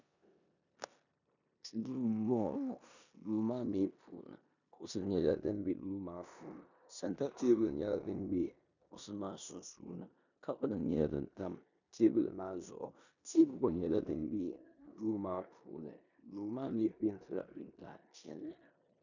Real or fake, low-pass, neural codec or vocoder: fake; 7.2 kHz; codec, 16 kHz in and 24 kHz out, 0.9 kbps, LongCat-Audio-Codec, four codebook decoder